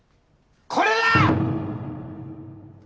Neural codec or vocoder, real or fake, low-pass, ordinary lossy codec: none; real; none; none